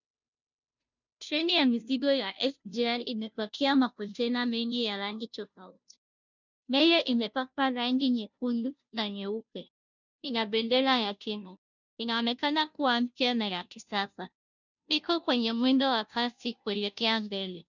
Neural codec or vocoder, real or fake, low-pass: codec, 16 kHz, 0.5 kbps, FunCodec, trained on Chinese and English, 25 frames a second; fake; 7.2 kHz